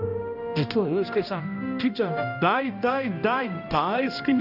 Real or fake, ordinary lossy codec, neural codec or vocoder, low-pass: fake; none; codec, 16 kHz, 1 kbps, X-Codec, HuBERT features, trained on balanced general audio; 5.4 kHz